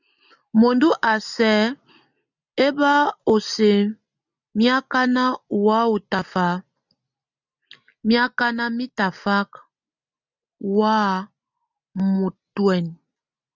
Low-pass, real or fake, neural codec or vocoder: 7.2 kHz; real; none